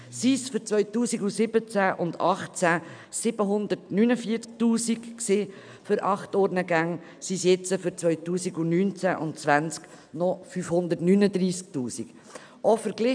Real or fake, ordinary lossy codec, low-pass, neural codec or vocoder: real; none; 9.9 kHz; none